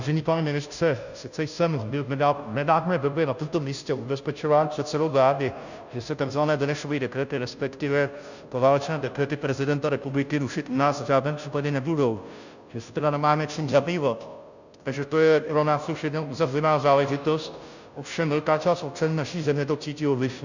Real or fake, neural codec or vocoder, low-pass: fake; codec, 16 kHz, 0.5 kbps, FunCodec, trained on Chinese and English, 25 frames a second; 7.2 kHz